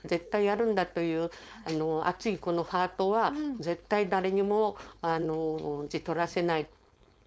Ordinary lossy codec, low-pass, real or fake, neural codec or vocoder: none; none; fake; codec, 16 kHz, 4.8 kbps, FACodec